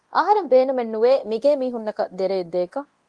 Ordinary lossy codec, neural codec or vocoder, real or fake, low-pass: Opus, 24 kbps; codec, 24 kHz, 0.9 kbps, DualCodec; fake; 10.8 kHz